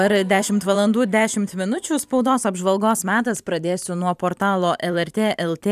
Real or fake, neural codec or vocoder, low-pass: fake; vocoder, 44.1 kHz, 128 mel bands every 512 samples, BigVGAN v2; 14.4 kHz